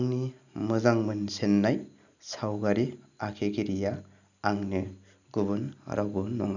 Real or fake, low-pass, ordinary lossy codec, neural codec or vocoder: real; 7.2 kHz; none; none